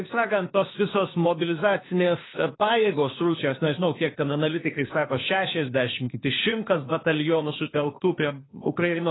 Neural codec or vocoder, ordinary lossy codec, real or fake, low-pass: codec, 16 kHz, 0.8 kbps, ZipCodec; AAC, 16 kbps; fake; 7.2 kHz